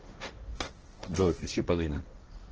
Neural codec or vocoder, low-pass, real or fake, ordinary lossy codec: codec, 16 kHz, 1.1 kbps, Voila-Tokenizer; 7.2 kHz; fake; Opus, 16 kbps